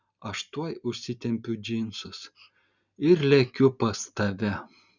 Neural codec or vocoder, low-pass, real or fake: none; 7.2 kHz; real